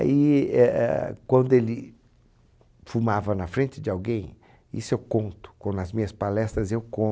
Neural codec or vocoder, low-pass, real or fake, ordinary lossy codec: none; none; real; none